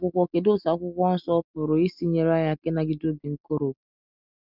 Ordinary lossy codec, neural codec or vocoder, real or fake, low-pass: none; none; real; 5.4 kHz